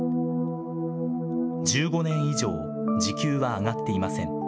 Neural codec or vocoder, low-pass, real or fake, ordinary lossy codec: none; none; real; none